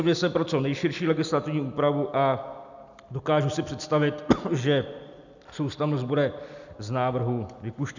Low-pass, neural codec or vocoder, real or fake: 7.2 kHz; none; real